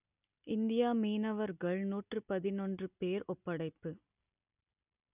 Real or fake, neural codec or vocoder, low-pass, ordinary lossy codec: real; none; 3.6 kHz; none